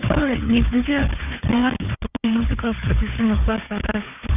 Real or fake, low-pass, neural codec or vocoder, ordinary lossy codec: fake; 3.6 kHz; codec, 16 kHz, 4 kbps, FunCodec, trained on Chinese and English, 50 frames a second; none